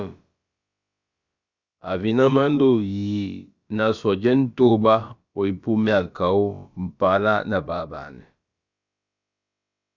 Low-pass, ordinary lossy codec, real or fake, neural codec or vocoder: 7.2 kHz; Opus, 64 kbps; fake; codec, 16 kHz, about 1 kbps, DyCAST, with the encoder's durations